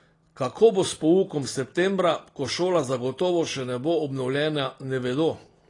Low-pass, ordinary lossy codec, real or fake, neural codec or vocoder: 10.8 kHz; AAC, 32 kbps; real; none